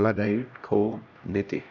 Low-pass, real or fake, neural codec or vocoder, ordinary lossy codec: 7.2 kHz; fake; codec, 16 kHz, 1 kbps, X-Codec, HuBERT features, trained on LibriSpeech; none